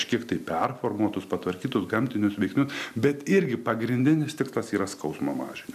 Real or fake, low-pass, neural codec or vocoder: real; 14.4 kHz; none